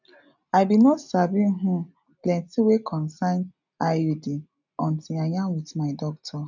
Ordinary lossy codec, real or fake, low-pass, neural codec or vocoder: none; real; 7.2 kHz; none